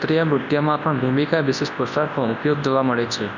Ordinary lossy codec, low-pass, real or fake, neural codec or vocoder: none; 7.2 kHz; fake; codec, 24 kHz, 0.9 kbps, WavTokenizer, large speech release